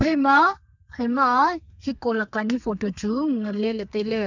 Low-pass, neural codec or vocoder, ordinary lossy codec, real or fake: 7.2 kHz; codec, 32 kHz, 1.9 kbps, SNAC; none; fake